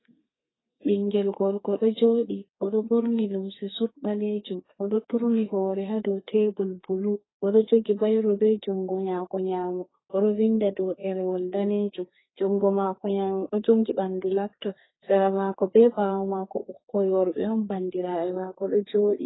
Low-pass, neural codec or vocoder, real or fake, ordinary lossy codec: 7.2 kHz; codec, 32 kHz, 1.9 kbps, SNAC; fake; AAC, 16 kbps